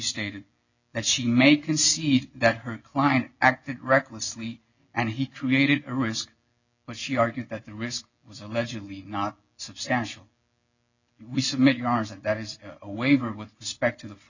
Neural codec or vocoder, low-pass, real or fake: none; 7.2 kHz; real